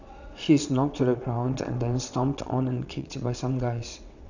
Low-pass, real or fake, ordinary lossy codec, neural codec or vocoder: 7.2 kHz; fake; none; vocoder, 22.05 kHz, 80 mel bands, WaveNeXt